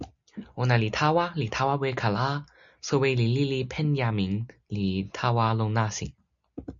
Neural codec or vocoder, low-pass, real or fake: none; 7.2 kHz; real